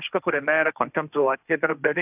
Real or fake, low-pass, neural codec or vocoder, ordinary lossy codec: fake; 3.6 kHz; codec, 16 kHz, 1.1 kbps, Voila-Tokenizer; AAC, 32 kbps